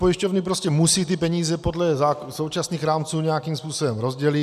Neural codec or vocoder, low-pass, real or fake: none; 14.4 kHz; real